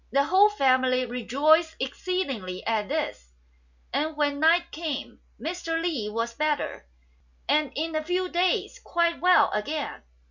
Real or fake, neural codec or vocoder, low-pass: real; none; 7.2 kHz